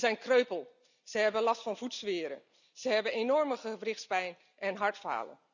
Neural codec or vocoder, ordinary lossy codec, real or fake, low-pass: none; none; real; 7.2 kHz